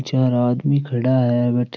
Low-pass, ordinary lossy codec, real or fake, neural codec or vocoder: 7.2 kHz; none; real; none